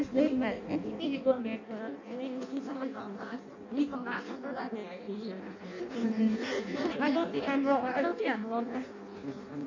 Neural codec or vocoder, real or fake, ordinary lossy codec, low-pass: codec, 16 kHz in and 24 kHz out, 0.6 kbps, FireRedTTS-2 codec; fake; none; 7.2 kHz